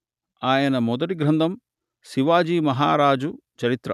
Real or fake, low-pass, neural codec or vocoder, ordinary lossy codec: real; 14.4 kHz; none; none